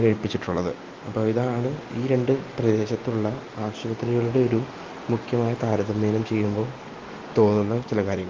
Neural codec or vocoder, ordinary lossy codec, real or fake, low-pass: none; Opus, 32 kbps; real; 7.2 kHz